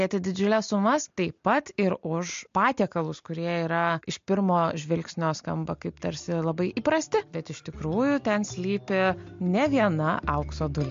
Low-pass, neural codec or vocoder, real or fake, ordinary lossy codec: 7.2 kHz; none; real; MP3, 48 kbps